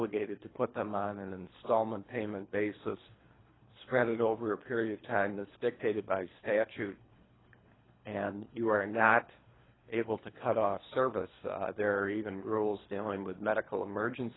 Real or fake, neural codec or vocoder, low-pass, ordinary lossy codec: fake; codec, 24 kHz, 3 kbps, HILCodec; 7.2 kHz; AAC, 16 kbps